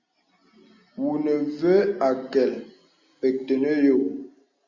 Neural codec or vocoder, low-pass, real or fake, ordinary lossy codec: none; 7.2 kHz; real; Opus, 64 kbps